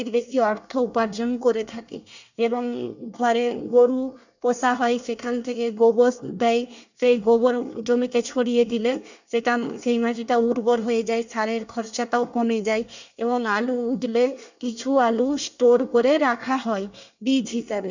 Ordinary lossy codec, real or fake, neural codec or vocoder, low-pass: none; fake; codec, 24 kHz, 1 kbps, SNAC; 7.2 kHz